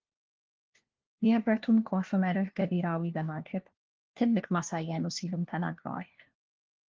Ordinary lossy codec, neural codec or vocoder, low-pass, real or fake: Opus, 16 kbps; codec, 16 kHz, 1 kbps, FunCodec, trained on LibriTTS, 50 frames a second; 7.2 kHz; fake